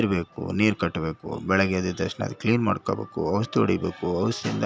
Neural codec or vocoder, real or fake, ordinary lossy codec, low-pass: none; real; none; none